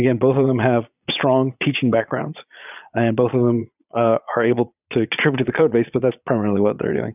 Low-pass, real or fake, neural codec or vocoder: 3.6 kHz; fake; vocoder, 22.05 kHz, 80 mel bands, Vocos